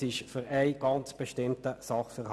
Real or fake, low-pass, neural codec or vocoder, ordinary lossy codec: real; none; none; none